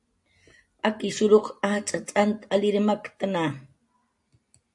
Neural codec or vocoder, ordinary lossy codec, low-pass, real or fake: none; AAC, 64 kbps; 10.8 kHz; real